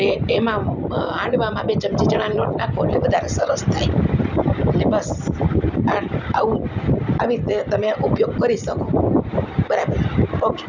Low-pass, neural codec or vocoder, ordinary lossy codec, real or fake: 7.2 kHz; none; none; real